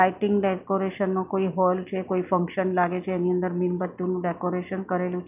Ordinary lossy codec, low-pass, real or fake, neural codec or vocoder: none; 3.6 kHz; real; none